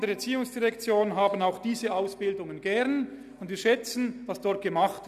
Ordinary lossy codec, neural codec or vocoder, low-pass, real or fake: none; none; 14.4 kHz; real